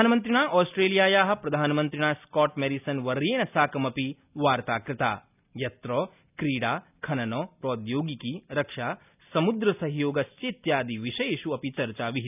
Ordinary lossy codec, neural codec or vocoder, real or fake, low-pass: none; none; real; 3.6 kHz